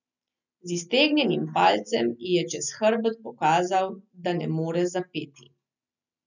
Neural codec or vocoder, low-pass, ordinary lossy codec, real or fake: none; 7.2 kHz; none; real